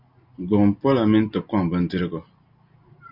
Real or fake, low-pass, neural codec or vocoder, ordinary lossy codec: real; 5.4 kHz; none; Opus, 64 kbps